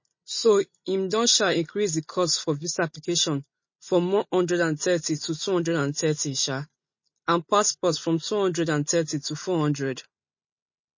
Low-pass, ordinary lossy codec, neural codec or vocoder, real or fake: 7.2 kHz; MP3, 32 kbps; none; real